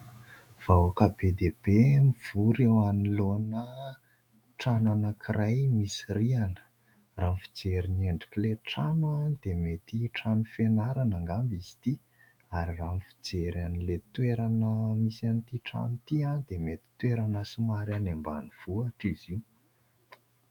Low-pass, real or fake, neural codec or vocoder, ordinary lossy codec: 19.8 kHz; fake; autoencoder, 48 kHz, 128 numbers a frame, DAC-VAE, trained on Japanese speech; MP3, 96 kbps